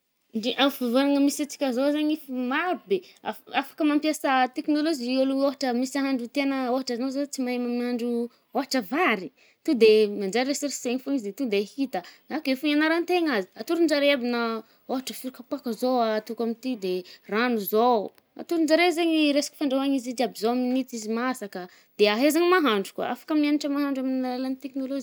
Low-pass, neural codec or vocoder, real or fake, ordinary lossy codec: none; none; real; none